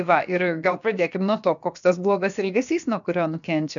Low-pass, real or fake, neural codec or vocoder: 7.2 kHz; fake; codec, 16 kHz, about 1 kbps, DyCAST, with the encoder's durations